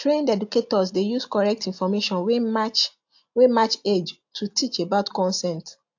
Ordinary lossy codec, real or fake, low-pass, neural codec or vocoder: AAC, 48 kbps; real; 7.2 kHz; none